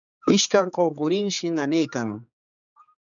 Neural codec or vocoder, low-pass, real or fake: codec, 16 kHz, 4 kbps, X-Codec, HuBERT features, trained on general audio; 7.2 kHz; fake